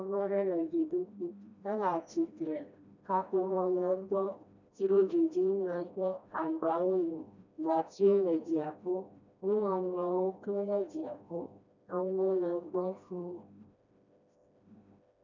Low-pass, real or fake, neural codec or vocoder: 7.2 kHz; fake; codec, 16 kHz, 1 kbps, FreqCodec, smaller model